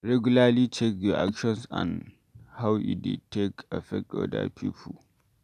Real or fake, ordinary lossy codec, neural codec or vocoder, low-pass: real; none; none; 14.4 kHz